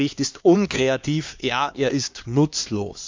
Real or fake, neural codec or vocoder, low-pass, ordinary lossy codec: fake; codec, 16 kHz, 2 kbps, X-Codec, HuBERT features, trained on LibriSpeech; 7.2 kHz; MP3, 64 kbps